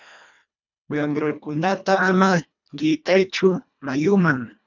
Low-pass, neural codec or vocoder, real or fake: 7.2 kHz; codec, 24 kHz, 1.5 kbps, HILCodec; fake